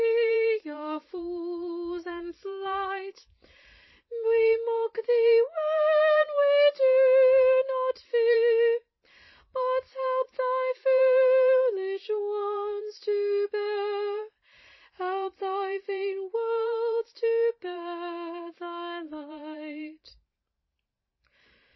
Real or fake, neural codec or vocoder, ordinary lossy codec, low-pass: fake; vocoder, 44.1 kHz, 128 mel bands every 512 samples, BigVGAN v2; MP3, 24 kbps; 7.2 kHz